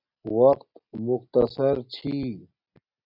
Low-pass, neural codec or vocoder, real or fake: 5.4 kHz; none; real